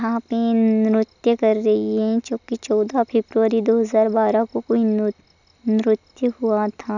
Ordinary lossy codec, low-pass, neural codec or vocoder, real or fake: none; 7.2 kHz; none; real